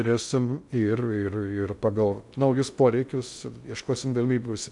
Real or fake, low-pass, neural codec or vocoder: fake; 10.8 kHz; codec, 16 kHz in and 24 kHz out, 0.6 kbps, FocalCodec, streaming, 2048 codes